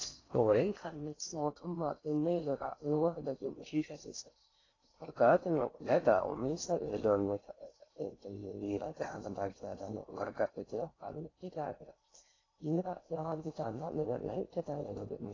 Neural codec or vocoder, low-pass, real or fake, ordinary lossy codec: codec, 16 kHz in and 24 kHz out, 0.6 kbps, FocalCodec, streaming, 4096 codes; 7.2 kHz; fake; AAC, 32 kbps